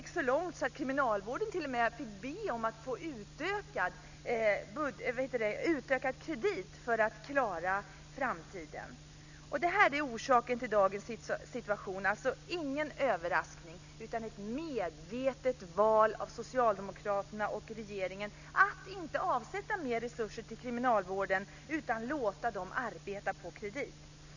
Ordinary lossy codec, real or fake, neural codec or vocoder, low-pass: none; real; none; 7.2 kHz